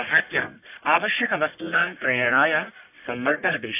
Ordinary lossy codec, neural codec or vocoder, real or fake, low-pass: AAC, 32 kbps; codec, 44.1 kHz, 1.7 kbps, Pupu-Codec; fake; 3.6 kHz